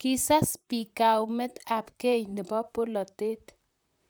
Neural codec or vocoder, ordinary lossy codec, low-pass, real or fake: vocoder, 44.1 kHz, 128 mel bands, Pupu-Vocoder; none; none; fake